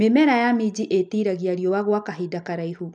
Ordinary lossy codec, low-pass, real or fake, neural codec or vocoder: MP3, 96 kbps; 10.8 kHz; real; none